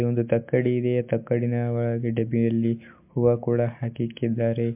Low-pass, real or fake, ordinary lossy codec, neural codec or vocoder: 3.6 kHz; real; MP3, 32 kbps; none